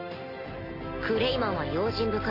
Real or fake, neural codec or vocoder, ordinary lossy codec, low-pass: real; none; AAC, 24 kbps; 5.4 kHz